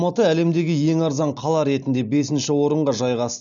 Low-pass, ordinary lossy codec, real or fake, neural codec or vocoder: 7.2 kHz; MP3, 96 kbps; real; none